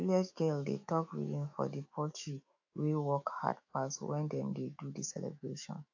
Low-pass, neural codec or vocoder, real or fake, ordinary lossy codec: 7.2 kHz; autoencoder, 48 kHz, 128 numbers a frame, DAC-VAE, trained on Japanese speech; fake; AAC, 48 kbps